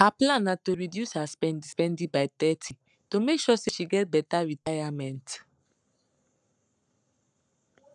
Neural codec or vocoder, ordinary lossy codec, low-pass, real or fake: codec, 44.1 kHz, 7.8 kbps, Pupu-Codec; none; 10.8 kHz; fake